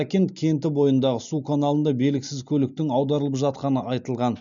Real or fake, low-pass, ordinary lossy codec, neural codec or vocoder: real; 7.2 kHz; none; none